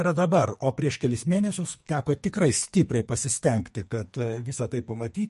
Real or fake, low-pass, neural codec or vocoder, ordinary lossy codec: fake; 14.4 kHz; codec, 44.1 kHz, 2.6 kbps, SNAC; MP3, 48 kbps